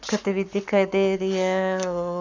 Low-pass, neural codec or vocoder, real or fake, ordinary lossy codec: 7.2 kHz; codec, 44.1 kHz, 7.8 kbps, Pupu-Codec; fake; none